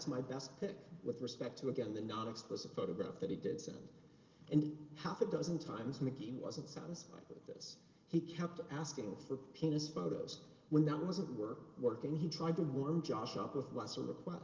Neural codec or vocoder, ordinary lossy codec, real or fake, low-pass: none; Opus, 32 kbps; real; 7.2 kHz